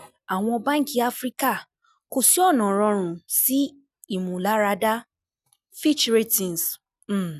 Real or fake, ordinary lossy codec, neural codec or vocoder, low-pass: real; none; none; 14.4 kHz